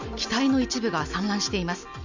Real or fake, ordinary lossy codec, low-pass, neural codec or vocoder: real; none; 7.2 kHz; none